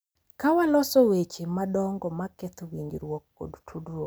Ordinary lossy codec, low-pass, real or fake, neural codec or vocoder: none; none; real; none